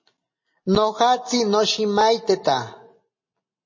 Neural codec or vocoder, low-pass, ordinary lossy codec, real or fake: none; 7.2 kHz; MP3, 32 kbps; real